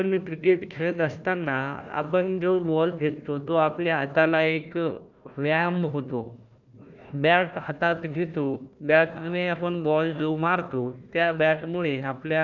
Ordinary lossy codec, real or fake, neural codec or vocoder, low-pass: none; fake; codec, 16 kHz, 1 kbps, FunCodec, trained on Chinese and English, 50 frames a second; 7.2 kHz